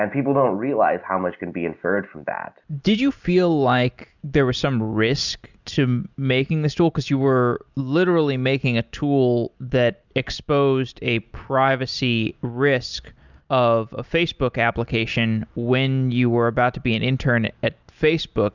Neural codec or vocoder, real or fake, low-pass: none; real; 7.2 kHz